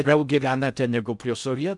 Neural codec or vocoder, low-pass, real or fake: codec, 16 kHz in and 24 kHz out, 0.6 kbps, FocalCodec, streaming, 2048 codes; 10.8 kHz; fake